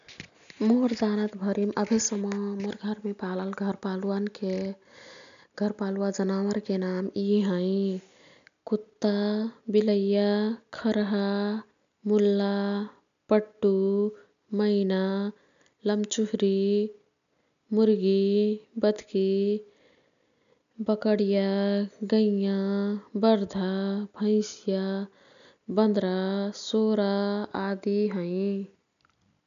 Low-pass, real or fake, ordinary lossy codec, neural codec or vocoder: 7.2 kHz; real; MP3, 96 kbps; none